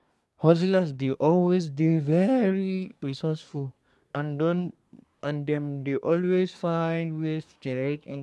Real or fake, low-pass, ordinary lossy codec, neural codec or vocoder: fake; none; none; codec, 24 kHz, 1 kbps, SNAC